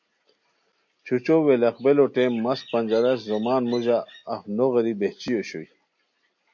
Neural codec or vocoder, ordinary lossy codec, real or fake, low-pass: none; MP3, 48 kbps; real; 7.2 kHz